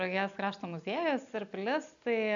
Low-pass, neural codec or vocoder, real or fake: 7.2 kHz; none; real